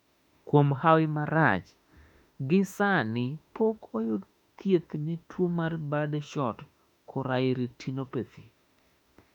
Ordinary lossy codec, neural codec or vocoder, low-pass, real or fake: none; autoencoder, 48 kHz, 32 numbers a frame, DAC-VAE, trained on Japanese speech; 19.8 kHz; fake